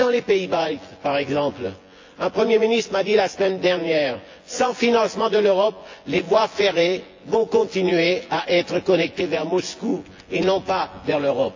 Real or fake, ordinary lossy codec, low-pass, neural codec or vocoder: fake; AAC, 48 kbps; 7.2 kHz; vocoder, 24 kHz, 100 mel bands, Vocos